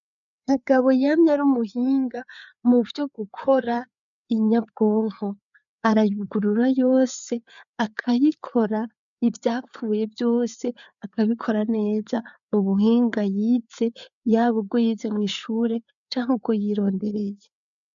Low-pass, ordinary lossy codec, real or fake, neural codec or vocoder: 7.2 kHz; MP3, 96 kbps; fake; codec, 16 kHz, 4 kbps, FreqCodec, larger model